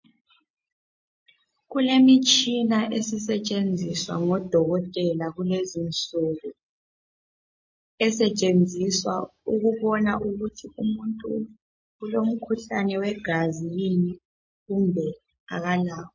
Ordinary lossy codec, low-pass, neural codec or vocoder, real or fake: MP3, 32 kbps; 7.2 kHz; none; real